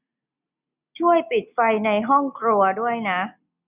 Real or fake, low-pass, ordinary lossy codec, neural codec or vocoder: real; 3.6 kHz; none; none